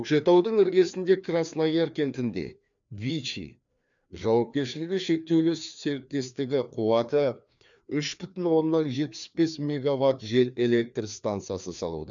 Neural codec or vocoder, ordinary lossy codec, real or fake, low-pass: codec, 16 kHz, 2 kbps, FreqCodec, larger model; MP3, 96 kbps; fake; 7.2 kHz